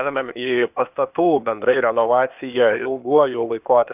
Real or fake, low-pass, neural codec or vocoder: fake; 3.6 kHz; codec, 16 kHz, 0.8 kbps, ZipCodec